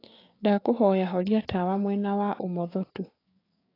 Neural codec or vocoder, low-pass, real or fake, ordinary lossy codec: codec, 16 kHz, 6 kbps, DAC; 5.4 kHz; fake; AAC, 24 kbps